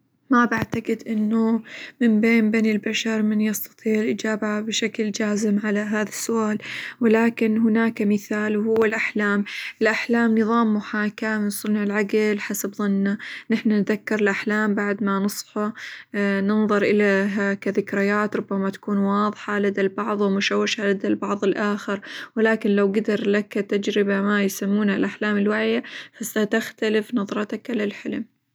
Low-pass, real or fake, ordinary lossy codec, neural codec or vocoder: none; real; none; none